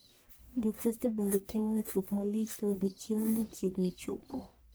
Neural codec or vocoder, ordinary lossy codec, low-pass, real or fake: codec, 44.1 kHz, 1.7 kbps, Pupu-Codec; none; none; fake